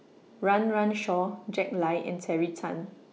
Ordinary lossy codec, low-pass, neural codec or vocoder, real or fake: none; none; none; real